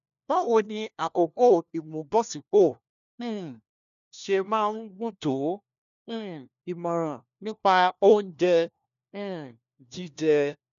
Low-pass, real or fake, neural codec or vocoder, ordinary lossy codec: 7.2 kHz; fake; codec, 16 kHz, 1 kbps, FunCodec, trained on LibriTTS, 50 frames a second; none